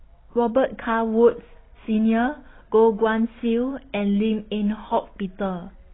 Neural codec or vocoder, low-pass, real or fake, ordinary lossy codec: none; 7.2 kHz; real; AAC, 16 kbps